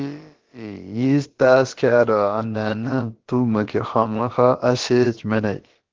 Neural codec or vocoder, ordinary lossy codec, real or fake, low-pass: codec, 16 kHz, about 1 kbps, DyCAST, with the encoder's durations; Opus, 16 kbps; fake; 7.2 kHz